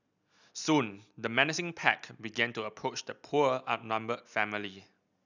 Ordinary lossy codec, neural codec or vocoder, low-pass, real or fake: none; none; 7.2 kHz; real